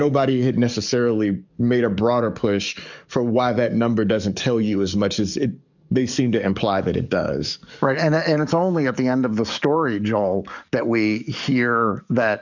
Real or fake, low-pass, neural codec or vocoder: fake; 7.2 kHz; codec, 44.1 kHz, 7.8 kbps, DAC